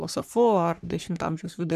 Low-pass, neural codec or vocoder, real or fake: 14.4 kHz; codec, 44.1 kHz, 3.4 kbps, Pupu-Codec; fake